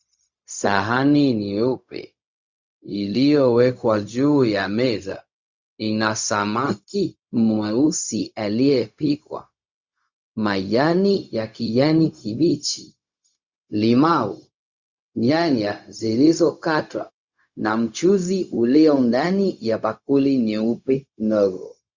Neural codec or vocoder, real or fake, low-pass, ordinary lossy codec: codec, 16 kHz, 0.4 kbps, LongCat-Audio-Codec; fake; 7.2 kHz; Opus, 64 kbps